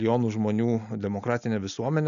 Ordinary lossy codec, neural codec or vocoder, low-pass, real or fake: MP3, 96 kbps; none; 7.2 kHz; real